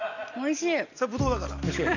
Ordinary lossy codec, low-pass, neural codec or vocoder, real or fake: none; 7.2 kHz; none; real